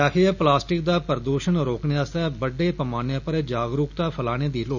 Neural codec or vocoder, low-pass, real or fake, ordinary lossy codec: none; 7.2 kHz; real; none